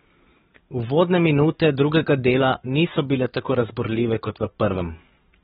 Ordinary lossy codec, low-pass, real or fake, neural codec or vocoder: AAC, 16 kbps; 19.8 kHz; fake; codec, 44.1 kHz, 7.8 kbps, DAC